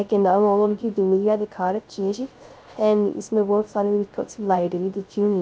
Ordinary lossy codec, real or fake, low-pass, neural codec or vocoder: none; fake; none; codec, 16 kHz, 0.3 kbps, FocalCodec